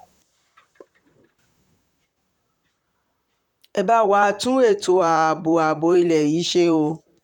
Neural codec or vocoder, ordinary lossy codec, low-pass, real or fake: vocoder, 44.1 kHz, 128 mel bands, Pupu-Vocoder; none; 19.8 kHz; fake